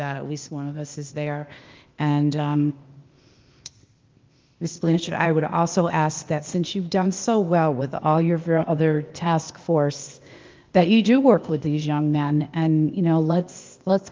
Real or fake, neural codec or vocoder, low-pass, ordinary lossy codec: fake; codec, 16 kHz, 0.8 kbps, ZipCodec; 7.2 kHz; Opus, 32 kbps